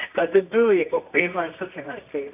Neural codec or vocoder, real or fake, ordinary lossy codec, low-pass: codec, 24 kHz, 0.9 kbps, WavTokenizer, medium music audio release; fake; none; 3.6 kHz